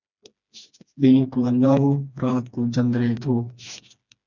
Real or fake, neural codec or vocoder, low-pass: fake; codec, 16 kHz, 2 kbps, FreqCodec, smaller model; 7.2 kHz